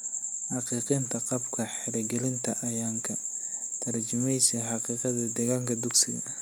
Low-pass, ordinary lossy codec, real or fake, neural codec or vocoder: none; none; real; none